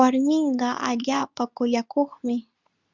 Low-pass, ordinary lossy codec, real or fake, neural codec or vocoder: 7.2 kHz; Opus, 64 kbps; fake; codec, 24 kHz, 0.9 kbps, WavTokenizer, medium speech release version 2